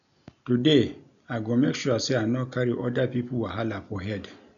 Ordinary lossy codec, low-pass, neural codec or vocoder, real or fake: none; 7.2 kHz; none; real